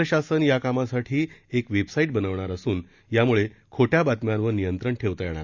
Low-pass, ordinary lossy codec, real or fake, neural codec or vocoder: 7.2 kHz; Opus, 64 kbps; real; none